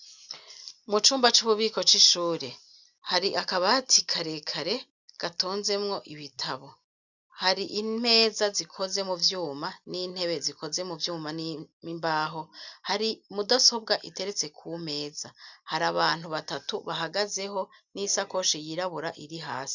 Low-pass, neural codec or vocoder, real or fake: 7.2 kHz; none; real